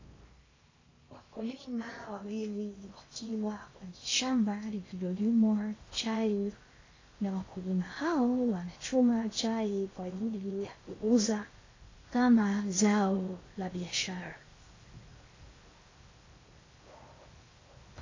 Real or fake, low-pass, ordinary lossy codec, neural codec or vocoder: fake; 7.2 kHz; AAC, 32 kbps; codec, 16 kHz in and 24 kHz out, 0.6 kbps, FocalCodec, streaming, 4096 codes